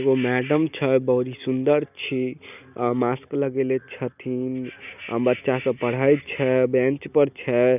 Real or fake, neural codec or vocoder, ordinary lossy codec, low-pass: real; none; none; 3.6 kHz